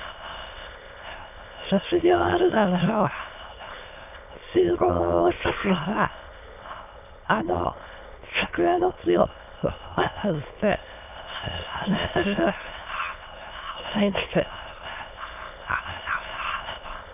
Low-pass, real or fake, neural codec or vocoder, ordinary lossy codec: 3.6 kHz; fake; autoencoder, 22.05 kHz, a latent of 192 numbers a frame, VITS, trained on many speakers; none